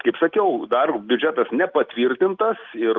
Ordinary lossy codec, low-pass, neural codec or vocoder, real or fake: Opus, 32 kbps; 7.2 kHz; none; real